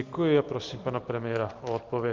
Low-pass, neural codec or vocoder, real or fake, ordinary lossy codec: 7.2 kHz; none; real; Opus, 32 kbps